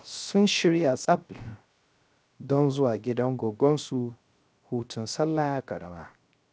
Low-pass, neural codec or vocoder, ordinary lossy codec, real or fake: none; codec, 16 kHz, 0.3 kbps, FocalCodec; none; fake